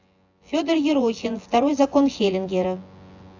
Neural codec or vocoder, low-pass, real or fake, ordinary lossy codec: vocoder, 24 kHz, 100 mel bands, Vocos; 7.2 kHz; fake; AAC, 48 kbps